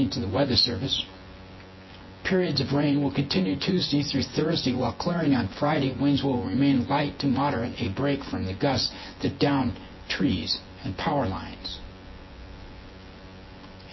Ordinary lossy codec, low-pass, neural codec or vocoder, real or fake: MP3, 24 kbps; 7.2 kHz; vocoder, 24 kHz, 100 mel bands, Vocos; fake